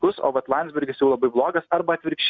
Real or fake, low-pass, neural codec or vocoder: real; 7.2 kHz; none